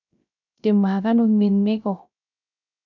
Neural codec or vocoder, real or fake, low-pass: codec, 16 kHz, 0.3 kbps, FocalCodec; fake; 7.2 kHz